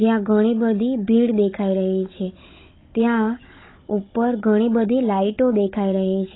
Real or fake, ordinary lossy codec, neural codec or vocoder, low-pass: fake; AAC, 16 kbps; codec, 16 kHz, 16 kbps, FunCodec, trained on Chinese and English, 50 frames a second; 7.2 kHz